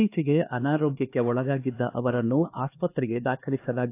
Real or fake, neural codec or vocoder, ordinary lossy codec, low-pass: fake; codec, 16 kHz, 2 kbps, X-Codec, HuBERT features, trained on LibriSpeech; AAC, 24 kbps; 3.6 kHz